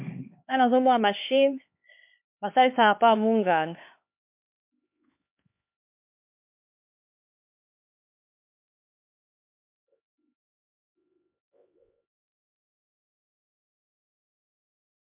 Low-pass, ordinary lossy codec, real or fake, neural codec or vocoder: 3.6 kHz; MP3, 32 kbps; fake; codec, 16 kHz, 2 kbps, X-Codec, HuBERT features, trained on LibriSpeech